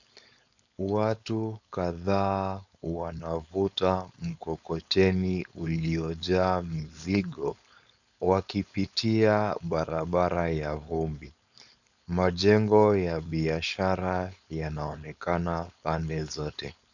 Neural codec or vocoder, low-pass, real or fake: codec, 16 kHz, 4.8 kbps, FACodec; 7.2 kHz; fake